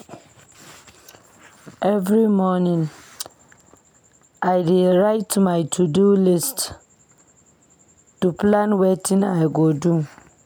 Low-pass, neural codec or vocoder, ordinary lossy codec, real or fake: none; none; none; real